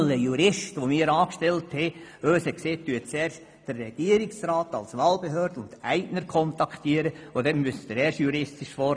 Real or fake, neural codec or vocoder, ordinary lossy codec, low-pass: real; none; none; none